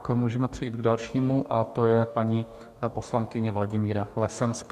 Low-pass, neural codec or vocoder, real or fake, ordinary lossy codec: 14.4 kHz; codec, 44.1 kHz, 2.6 kbps, DAC; fake; MP3, 96 kbps